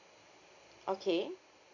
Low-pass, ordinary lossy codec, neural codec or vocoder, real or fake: 7.2 kHz; none; none; real